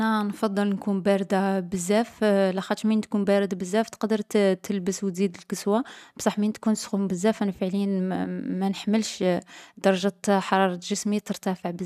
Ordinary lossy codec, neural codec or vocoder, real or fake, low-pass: none; none; real; 14.4 kHz